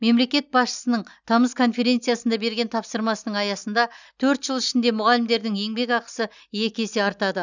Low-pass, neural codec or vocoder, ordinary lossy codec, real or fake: 7.2 kHz; none; none; real